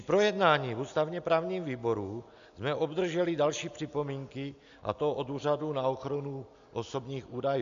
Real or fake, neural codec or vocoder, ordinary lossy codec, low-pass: real; none; AAC, 96 kbps; 7.2 kHz